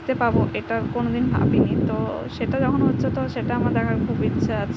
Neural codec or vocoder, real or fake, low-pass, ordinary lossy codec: none; real; none; none